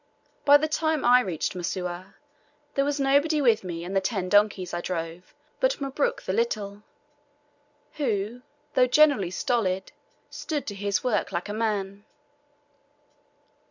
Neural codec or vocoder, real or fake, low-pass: none; real; 7.2 kHz